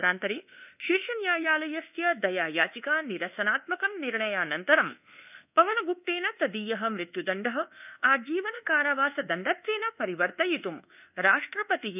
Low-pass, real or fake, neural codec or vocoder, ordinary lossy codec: 3.6 kHz; fake; codec, 24 kHz, 1.2 kbps, DualCodec; none